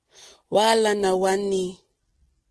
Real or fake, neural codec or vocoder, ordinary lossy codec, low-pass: real; none; Opus, 16 kbps; 10.8 kHz